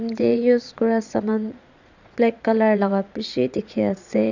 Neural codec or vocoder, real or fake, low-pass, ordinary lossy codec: vocoder, 22.05 kHz, 80 mel bands, Vocos; fake; 7.2 kHz; none